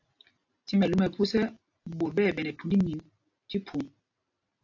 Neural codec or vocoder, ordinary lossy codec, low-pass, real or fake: none; AAC, 48 kbps; 7.2 kHz; real